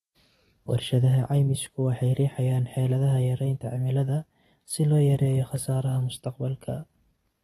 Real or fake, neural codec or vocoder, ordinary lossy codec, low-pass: real; none; AAC, 32 kbps; 19.8 kHz